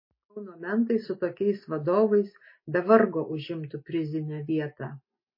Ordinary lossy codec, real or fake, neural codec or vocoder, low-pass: MP3, 24 kbps; real; none; 5.4 kHz